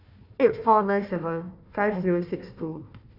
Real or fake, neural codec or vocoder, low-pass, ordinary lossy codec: fake; codec, 16 kHz, 1 kbps, FunCodec, trained on Chinese and English, 50 frames a second; 5.4 kHz; none